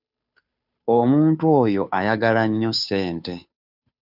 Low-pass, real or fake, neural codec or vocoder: 5.4 kHz; fake; codec, 16 kHz, 2 kbps, FunCodec, trained on Chinese and English, 25 frames a second